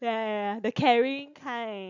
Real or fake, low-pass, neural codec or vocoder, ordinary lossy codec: real; 7.2 kHz; none; none